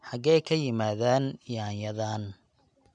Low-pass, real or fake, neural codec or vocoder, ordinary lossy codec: 9.9 kHz; real; none; none